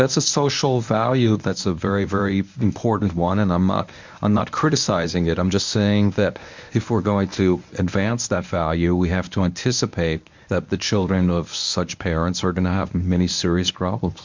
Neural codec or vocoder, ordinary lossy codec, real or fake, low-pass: codec, 24 kHz, 0.9 kbps, WavTokenizer, medium speech release version 1; MP3, 64 kbps; fake; 7.2 kHz